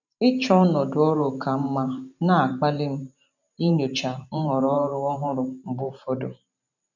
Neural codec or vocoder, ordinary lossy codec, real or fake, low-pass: vocoder, 44.1 kHz, 128 mel bands every 512 samples, BigVGAN v2; none; fake; 7.2 kHz